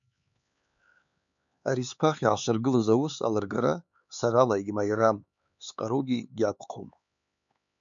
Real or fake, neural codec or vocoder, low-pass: fake; codec, 16 kHz, 4 kbps, X-Codec, HuBERT features, trained on LibriSpeech; 7.2 kHz